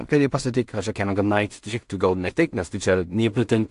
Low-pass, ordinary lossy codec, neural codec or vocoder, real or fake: 10.8 kHz; AAC, 64 kbps; codec, 16 kHz in and 24 kHz out, 0.4 kbps, LongCat-Audio-Codec, two codebook decoder; fake